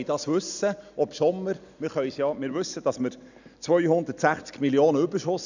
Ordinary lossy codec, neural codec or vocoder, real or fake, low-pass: none; none; real; 7.2 kHz